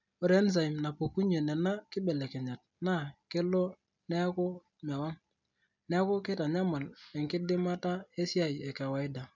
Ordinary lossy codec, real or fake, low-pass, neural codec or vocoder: none; real; 7.2 kHz; none